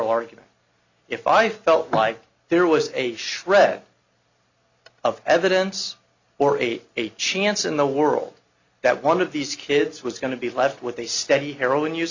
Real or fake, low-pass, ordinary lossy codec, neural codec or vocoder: real; 7.2 kHz; Opus, 64 kbps; none